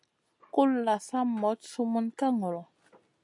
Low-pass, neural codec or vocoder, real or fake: 10.8 kHz; none; real